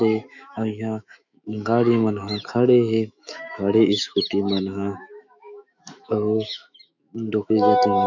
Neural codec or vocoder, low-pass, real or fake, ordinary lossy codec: autoencoder, 48 kHz, 128 numbers a frame, DAC-VAE, trained on Japanese speech; 7.2 kHz; fake; none